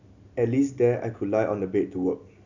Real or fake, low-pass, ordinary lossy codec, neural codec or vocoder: real; 7.2 kHz; none; none